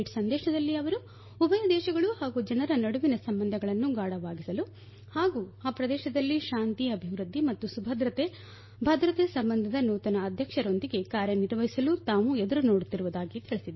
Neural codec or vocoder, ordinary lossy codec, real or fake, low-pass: none; MP3, 24 kbps; real; 7.2 kHz